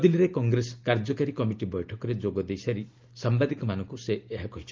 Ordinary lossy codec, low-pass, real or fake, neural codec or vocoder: Opus, 32 kbps; 7.2 kHz; real; none